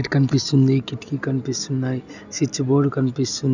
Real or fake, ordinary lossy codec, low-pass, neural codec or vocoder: real; none; 7.2 kHz; none